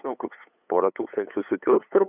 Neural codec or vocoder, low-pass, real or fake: codec, 16 kHz, 8 kbps, FunCodec, trained on LibriTTS, 25 frames a second; 3.6 kHz; fake